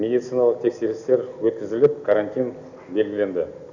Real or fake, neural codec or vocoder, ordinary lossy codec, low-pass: real; none; none; 7.2 kHz